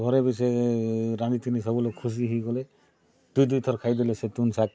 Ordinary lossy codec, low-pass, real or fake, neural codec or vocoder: none; none; real; none